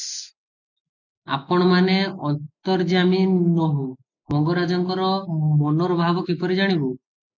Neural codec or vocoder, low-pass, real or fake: none; 7.2 kHz; real